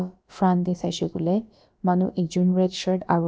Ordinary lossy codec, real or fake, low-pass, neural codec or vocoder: none; fake; none; codec, 16 kHz, about 1 kbps, DyCAST, with the encoder's durations